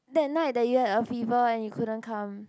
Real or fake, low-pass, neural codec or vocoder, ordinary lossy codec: real; none; none; none